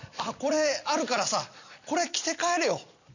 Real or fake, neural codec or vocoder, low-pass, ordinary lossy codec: fake; vocoder, 44.1 kHz, 128 mel bands every 256 samples, BigVGAN v2; 7.2 kHz; none